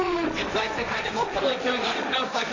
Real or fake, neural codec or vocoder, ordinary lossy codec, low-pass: fake; codec, 16 kHz, 1.1 kbps, Voila-Tokenizer; AAC, 48 kbps; 7.2 kHz